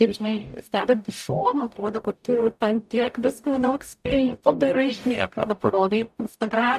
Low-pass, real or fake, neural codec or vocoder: 14.4 kHz; fake; codec, 44.1 kHz, 0.9 kbps, DAC